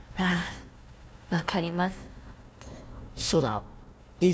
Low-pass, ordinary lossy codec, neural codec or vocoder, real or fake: none; none; codec, 16 kHz, 1 kbps, FunCodec, trained on Chinese and English, 50 frames a second; fake